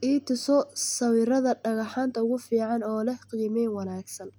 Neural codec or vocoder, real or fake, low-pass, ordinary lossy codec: none; real; none; none